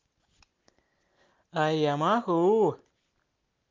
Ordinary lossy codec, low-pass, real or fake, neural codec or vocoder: Opus, 32 kbps; 7.2 kHz; real; none